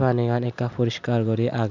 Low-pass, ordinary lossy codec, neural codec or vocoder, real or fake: 7.2 kHz; none; none; real